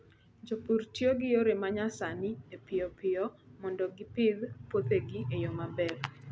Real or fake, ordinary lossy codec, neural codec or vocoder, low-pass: real; none; none; none